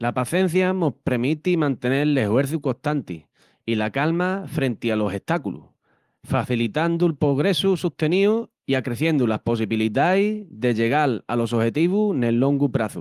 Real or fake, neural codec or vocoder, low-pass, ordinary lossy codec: real; none; 14.4 kHz; Opus, 24 kbps